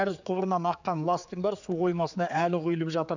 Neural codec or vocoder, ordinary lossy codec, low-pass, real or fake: codec, 16 kHz, 4 kbps, X-Codec, HuBERT features, trained on general audio; none; 7.2 kHz; fake